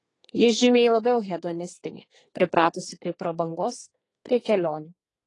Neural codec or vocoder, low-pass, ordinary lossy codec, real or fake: codec, 32 kHz, 1.9 kbps, SNAC; 10.8 kHz; AAC, 32 kbps; fake